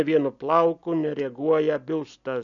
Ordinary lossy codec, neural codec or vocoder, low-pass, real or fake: MP3, 96 kbps; none; 7.2 kHz; real